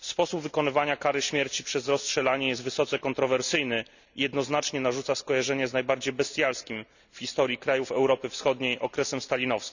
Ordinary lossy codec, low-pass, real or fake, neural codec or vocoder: none; 7.2 kHz; real; none